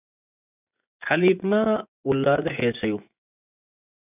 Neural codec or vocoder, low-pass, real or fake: vocoder, 24 kHz, 100 mel bands, Vocos; 3.6 kHz; fake